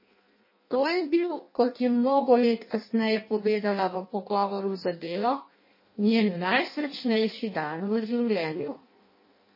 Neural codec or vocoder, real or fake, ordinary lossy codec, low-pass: codec, 16 kHz in and 24 kHz out, 0.6 kbps, FireRedTTS-2 codec; fake; MP3, 24 kbps; 5.4 kHz